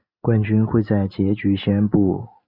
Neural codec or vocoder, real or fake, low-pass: none; real; 5.4 kHz